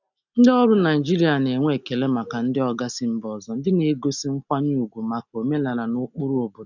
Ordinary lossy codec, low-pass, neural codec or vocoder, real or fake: none; 7.2 kHz; none; real